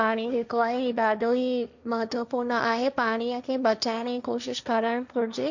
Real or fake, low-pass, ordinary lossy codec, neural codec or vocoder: fake; 7.2 kHz; none; codec, 16 kHz, 1.1 kbps, Voila-Tokenizer